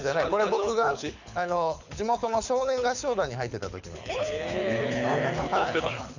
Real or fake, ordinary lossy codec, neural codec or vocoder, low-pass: fake; none; codec, 24 kHz, 6 kbps, HILCodec; 7.2 kHz